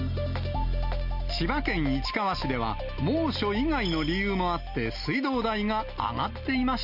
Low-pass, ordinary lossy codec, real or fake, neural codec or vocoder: 5.4 kHz; Opus, 64 kbps; real; none